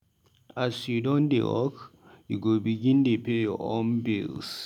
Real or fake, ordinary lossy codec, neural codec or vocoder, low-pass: real; none; none; 19.8 kHz